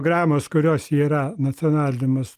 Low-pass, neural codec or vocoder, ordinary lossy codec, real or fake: 14.4 kHz; none; Opus, 32 kbps; real